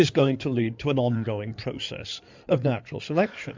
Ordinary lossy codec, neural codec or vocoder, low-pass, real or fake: MP3, 64 kbps; codec, 16 kHz in and 24 kHz out, 2.2 kbps, FireRedTTS-2 codec; 7.2 kHz; fake